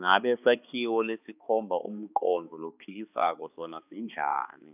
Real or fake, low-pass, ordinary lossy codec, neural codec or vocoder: fake; 3.6 kHz; none; codec, 16 kHz, 2 kbps, X-Codec, HuBERT features, trained on balanced general audio